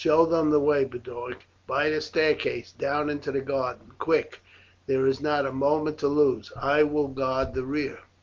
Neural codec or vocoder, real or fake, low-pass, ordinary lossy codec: none; real; 7.2 kHz; Opus, 32 kbps